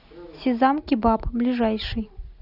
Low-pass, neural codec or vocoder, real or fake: 5.4 kHz; none; real